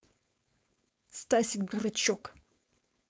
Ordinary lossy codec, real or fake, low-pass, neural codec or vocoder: none; fake; none; codec, 16 kHz, 4.8 kbps, FACodec